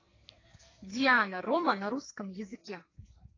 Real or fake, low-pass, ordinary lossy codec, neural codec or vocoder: fake; 7.2 kHz; AAC, 32 kbps; codec, 44.1 kHz, 2.6 kbps, SNAC